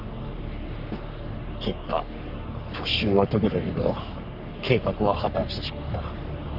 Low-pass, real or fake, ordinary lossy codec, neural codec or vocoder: 5.4 kHz; fake; none; codec, 44.1 kHz, 3.4 kbps, Pupu-Codec